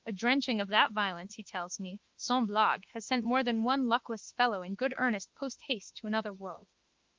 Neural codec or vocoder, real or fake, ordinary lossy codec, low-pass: autoencoder, 48 kHz, 32 numbers a frame, DAC-VAE, trained on Japanese speech; fake; Opus, 24 kbps; 7.2 kHz